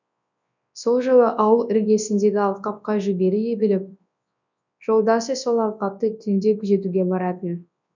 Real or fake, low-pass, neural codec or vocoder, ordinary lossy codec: fake; 7.2 kHz; codec, 24 kHz, 0.9 kbps, WavTokenizer, large speech release; none